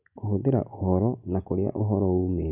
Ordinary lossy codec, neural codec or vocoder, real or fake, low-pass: none; none; real; 3.6 kHz